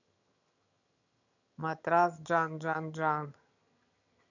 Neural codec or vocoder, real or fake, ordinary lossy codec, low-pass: vocoder, 22.05 kHz, 80 mel bands, HiFi-GAN; fake; none; 7.2 kHz